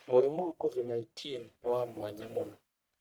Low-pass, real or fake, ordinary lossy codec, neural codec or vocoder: none; fake; none; codec, 44.1 kHz, 1.7 kbps, Pupu-Codec